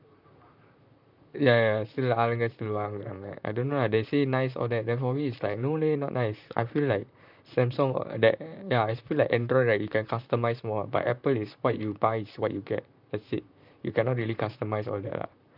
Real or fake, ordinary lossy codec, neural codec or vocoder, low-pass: fake; none; vocoder, 44.1 kHz, 128 mel bands, Pupu-Vocoder; 5.4 kHz